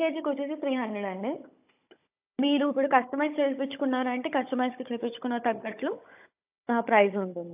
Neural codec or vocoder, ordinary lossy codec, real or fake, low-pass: codec, 16 kHz, 16 kbps, FunCodec, trained on Chinese and English, 50 frames a second; AAC, 32 kbps; fake; 3.6 kHz